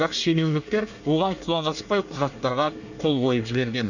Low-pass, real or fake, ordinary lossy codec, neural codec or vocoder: 7.2 kHz; fake; none; codec, 24 kHz, 1 kbps, SNAC